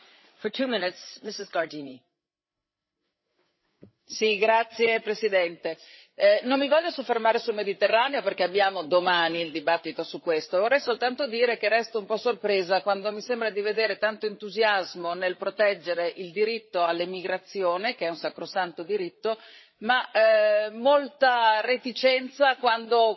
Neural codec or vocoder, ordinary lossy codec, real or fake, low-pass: codec, 16 kHz, 8 kbps, FreqCodec, larger model; MP3, 24 kbps; fake; 7.2 kHz